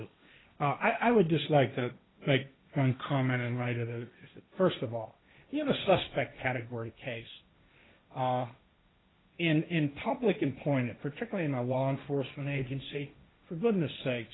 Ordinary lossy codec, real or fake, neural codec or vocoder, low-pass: AAC, 16 kbps; fake; codec, 16 kHz, 1.1 kbps, Voila-Tokenizer; 7.2 kHz